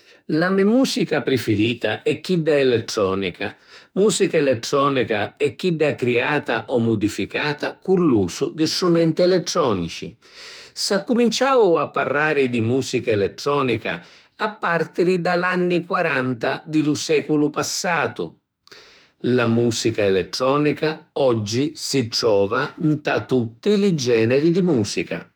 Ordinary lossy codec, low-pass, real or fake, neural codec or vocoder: none; none; fake; autoencoder, 48 kHz, 32 numbers a frame, DAC-VAE, trained on Japanese speech